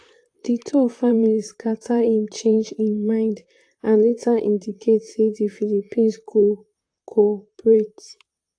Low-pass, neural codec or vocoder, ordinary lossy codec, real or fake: 9.9 kHz; vocoder, 44.1 kHz, 128 mel bands, Pupu-Vocoder; AAC, 48 kbps; fake